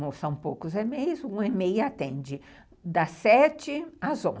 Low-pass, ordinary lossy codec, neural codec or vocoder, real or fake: none; none; none; real